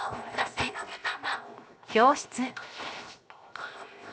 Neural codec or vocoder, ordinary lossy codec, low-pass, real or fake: codec, 16 kHz, 0.7 kbps, FocalCodec; none; none; fake